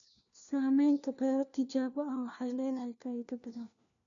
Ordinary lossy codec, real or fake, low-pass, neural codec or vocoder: MP3, 96 kbps; fake; 7.2 kHz; codec, 16 kHz, 1 kbps, FunCodec, trained on LibriTTS, 50 frames a second